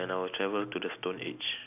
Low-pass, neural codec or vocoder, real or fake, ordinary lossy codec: 3.6 kHz; none; real; none